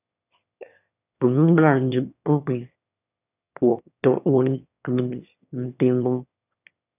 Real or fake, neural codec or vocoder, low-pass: fake; autoencoder, 22.05 kHz, a latent of 192 numbers a frame, VITS, trained on one speaker; 3.6 kHz